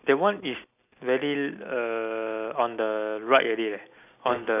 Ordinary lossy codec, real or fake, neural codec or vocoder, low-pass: none; real; none; 3.6 kHz